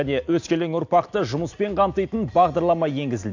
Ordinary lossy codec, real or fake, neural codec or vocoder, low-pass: AAC, 48 kbps; real; none; 7.2 kHz